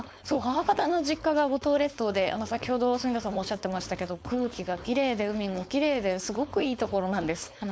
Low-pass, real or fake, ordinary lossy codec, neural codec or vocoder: none; fake; none; codec, 16 kHz, 4.8 kbps, FACodec